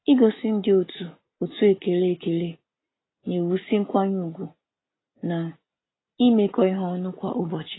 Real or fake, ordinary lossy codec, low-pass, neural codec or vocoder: real; AAC, 16 kbps; 7.2 kHz; none